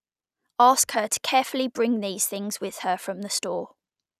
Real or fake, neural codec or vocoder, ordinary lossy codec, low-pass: real; none; none; 14.4 kHz